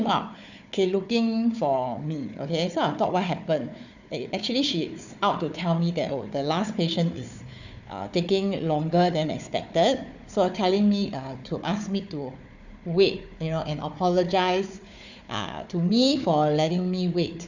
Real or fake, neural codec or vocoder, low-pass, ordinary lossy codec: fake; codec, 16 kHz, 4 kbps, FunCodec, trained on Chinese and English, 50 frames a second; 7.2 kHz; none